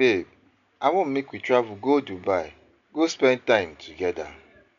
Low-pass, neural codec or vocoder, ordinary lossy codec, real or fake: 7.2 kHz; none; none; real